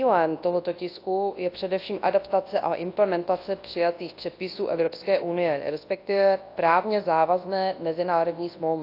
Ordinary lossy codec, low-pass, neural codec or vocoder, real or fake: AAC, 32 kbps; 5.4 kHz; codec, 24 kHz, 0.9 kbps, WavTokenizer, large speech release; fake